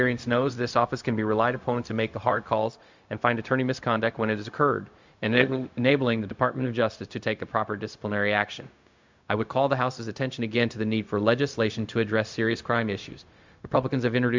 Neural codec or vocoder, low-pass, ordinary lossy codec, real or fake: codec, 16 kHz, 0.4 kbps, LongCat-Audio-Codec; 7.2 kHz; MP3, 64 kbps; fake